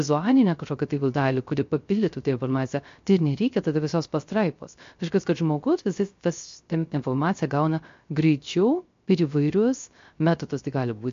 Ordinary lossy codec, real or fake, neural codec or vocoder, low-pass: MP3, 48 kbps; fake; codec, 16 kHz, 0.3 kbps, FocalCodec; 7.2 kHz